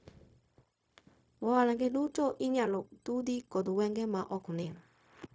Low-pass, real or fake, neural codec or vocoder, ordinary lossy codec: none; fake; codec, 16 kHz, 0.4 kbps, LongCat-Audio-Codec; none